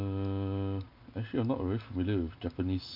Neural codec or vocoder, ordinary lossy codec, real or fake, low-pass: none; MP3, 48 kbps; real; 5.4 kHz